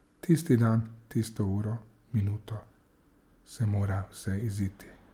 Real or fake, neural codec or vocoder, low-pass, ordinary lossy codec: real; none; 19.8 kHz; Opus, 32 kbps